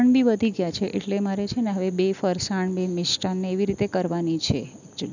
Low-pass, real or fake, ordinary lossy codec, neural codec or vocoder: 7.2 kHz; real; none; none